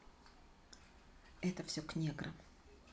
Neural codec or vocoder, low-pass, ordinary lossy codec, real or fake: none; none; none; real